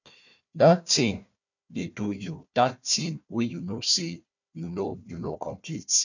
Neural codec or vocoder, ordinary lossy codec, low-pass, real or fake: codec, 16 kHz, 1 kbps, FunCodec, trained on Chinese and English, 50 frames a second; none; 7.2 kHz; fake